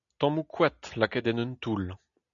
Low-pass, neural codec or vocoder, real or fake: 7.2 kHz; none; real